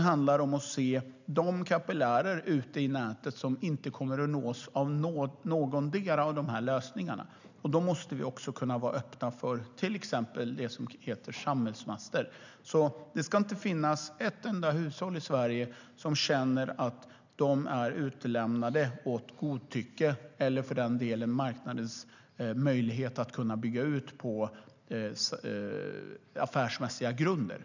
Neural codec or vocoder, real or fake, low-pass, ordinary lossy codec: none; real; 7.2 kHz; none